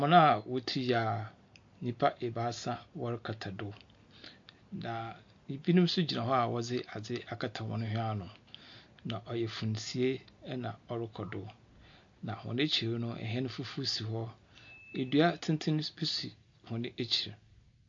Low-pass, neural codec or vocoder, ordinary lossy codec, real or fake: 7.2 kHz; none; MP3, 48 kbps; real